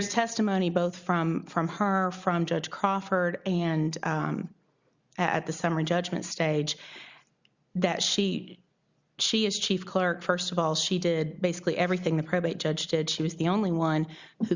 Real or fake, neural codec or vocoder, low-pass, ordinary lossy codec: real; none; 7.2 kHz; Opus, 64 kbps